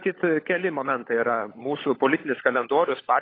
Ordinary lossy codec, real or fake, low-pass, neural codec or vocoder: AAC, 24 kbps; fake; 5.4 kHz; codec, 16 kHz, 16 kbps, FunCodec, trained on LibriTTS, 50 frames a second